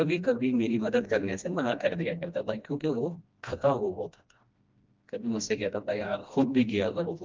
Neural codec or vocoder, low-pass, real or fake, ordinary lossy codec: codec, 16 kHz, 1 kbps, FreqCodec, smaller model; 7.2 kHz; fake; Opus, 24 kbps